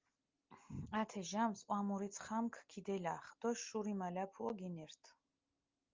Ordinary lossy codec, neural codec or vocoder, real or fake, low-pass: Opus, 24 kbps; none; real; 7.2 kHz